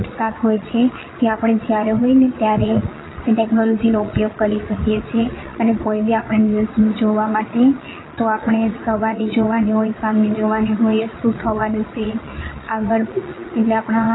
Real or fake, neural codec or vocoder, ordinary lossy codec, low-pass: fake; vocoder, 22.05 kHz, 80 mel bands, Vocos; AAC, 16 kbps; 7.2 kHz